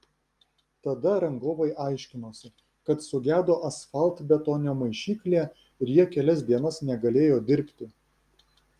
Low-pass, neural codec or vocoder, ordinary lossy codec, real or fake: 14.4 kHz; none; Opus, 32 kbps; real